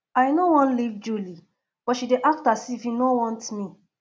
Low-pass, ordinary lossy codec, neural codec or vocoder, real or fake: none; none; none; real